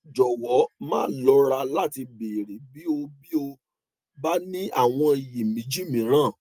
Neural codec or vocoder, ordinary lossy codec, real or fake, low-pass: none; Opus, 32 kbps; real; 14.4 kHz